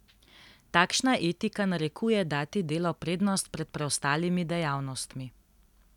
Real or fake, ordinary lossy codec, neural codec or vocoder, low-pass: real; none; none; 19.8 kHz